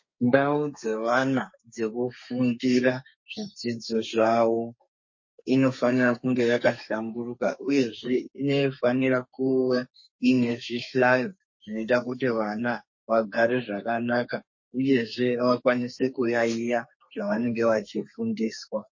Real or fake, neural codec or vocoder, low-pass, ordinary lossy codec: fake; codec, 32 kHz, 1.9 kbps, SNAC; 7.2 kHz; MP3, 32 kbps